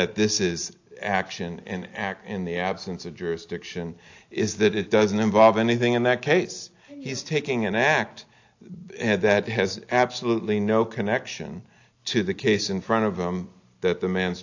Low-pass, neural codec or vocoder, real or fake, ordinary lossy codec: 7.2 kHz; none; real; AAC, 48 kbps